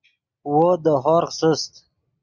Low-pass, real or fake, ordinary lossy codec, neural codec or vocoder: 7.2 kHz; real; Opus, 64 kbps; none